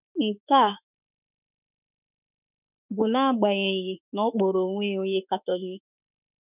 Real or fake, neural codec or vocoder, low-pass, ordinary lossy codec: fake; autoencoder, 48 kHz, 32 numbers a frame, DAC-VAE, trained on Japanese speech; 3.6 kHz; none